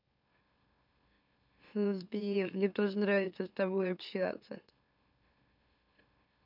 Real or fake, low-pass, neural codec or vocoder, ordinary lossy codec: fake; 5.4 kHz; autoencoder, 44.1 kHz, a latent of 192 numbers a frame, MeloTTS; none